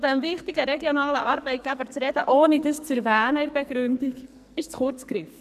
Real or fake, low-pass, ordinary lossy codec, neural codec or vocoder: fake; 14.4 kHz; none; codec, 44.1 kHz, 2.6 kbps, SNAC